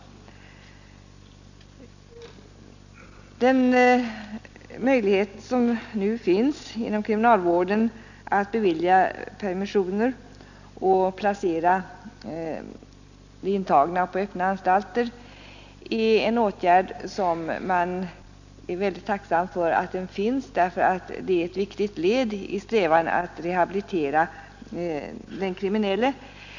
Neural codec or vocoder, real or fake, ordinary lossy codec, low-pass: none; real; none; 7.2 kHz